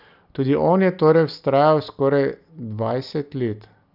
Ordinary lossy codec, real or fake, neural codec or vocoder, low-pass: none; real; none; 5.4 kHz